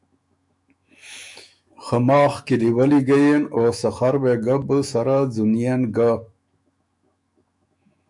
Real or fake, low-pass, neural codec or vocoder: fake; 10.8 kHz; autoencoder, 48 kHz, 128 numbers a frame, DAC-VAE, trained on Japanese speech